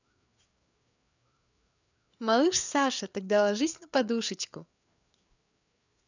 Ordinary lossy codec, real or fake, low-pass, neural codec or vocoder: none; fake; 7.2 kHz; codec, 16 kHz, 4 kbps, FreqCodec, larger model